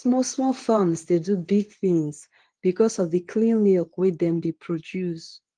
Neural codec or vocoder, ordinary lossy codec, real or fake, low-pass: codec, 24 kHz, 0.9 kbps, WavTokenizer, medium speech release version 2; Opus, 24 kbps; fake; 9.9 kHz